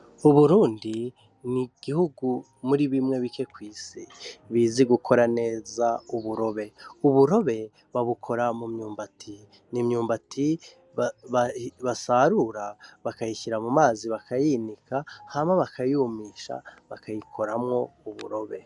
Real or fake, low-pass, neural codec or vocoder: real; 10.8 kHz; none